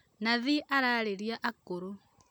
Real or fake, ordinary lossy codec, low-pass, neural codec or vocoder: real; none; none; none